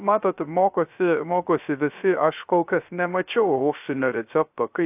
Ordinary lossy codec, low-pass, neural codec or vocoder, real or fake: AAC, 32 kbps; 3.6 kHz; codec, 16 kHz, 0.3 kbps, FocalCodec; fake